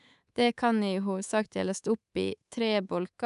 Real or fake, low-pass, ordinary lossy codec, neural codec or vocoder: fake; 10.8 kHz; none; codec, 24 kHz, 3.1 kbps, DualCodec